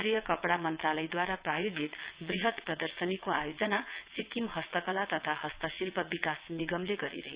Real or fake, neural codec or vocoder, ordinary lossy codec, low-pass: fake; vocoder, 22.05 kHz, 80 mel bands, WaveNeXt; Opus, 64 kbps; 3.6 kHz